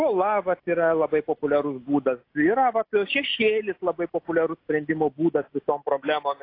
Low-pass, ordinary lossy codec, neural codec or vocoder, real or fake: 5.4 kHz; AAC, 32 kbps; none; real